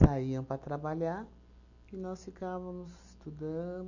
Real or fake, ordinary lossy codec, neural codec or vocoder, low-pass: real; none; none; 7.2 kHz